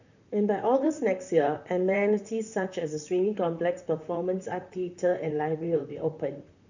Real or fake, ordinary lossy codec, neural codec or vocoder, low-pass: fake; none; vocoder, 44.1 kHz, 128 mel bands, Pupu-Vocoder; 7.2 kHz